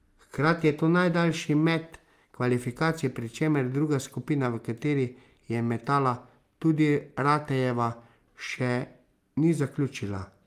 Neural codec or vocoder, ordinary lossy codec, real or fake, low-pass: none; Opus, 32 kbps; real; 14.4 kHz